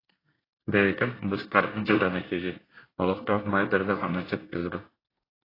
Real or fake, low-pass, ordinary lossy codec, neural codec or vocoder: fake; 5.4 kHz; AAC, 24 kbps; codec, 24 kHz, 1 kbps, SNAC